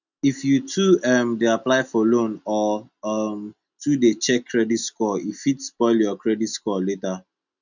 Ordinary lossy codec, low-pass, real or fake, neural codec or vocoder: none; 7.2 kHz; real; none